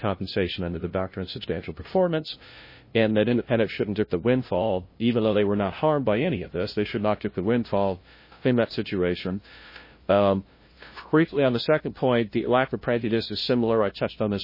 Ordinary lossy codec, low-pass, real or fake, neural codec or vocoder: MP3, 24 kbps; 5.4 kHz; fake; codec, 16 kHz, 0.5 kbps, FunCodec, trained on LibriTTS, 25 frames a second